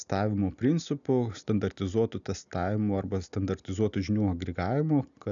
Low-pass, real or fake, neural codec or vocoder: 7.2 kHz; real; none